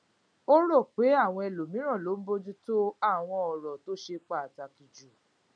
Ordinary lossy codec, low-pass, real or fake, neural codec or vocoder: none; 9.9 kHz; real; none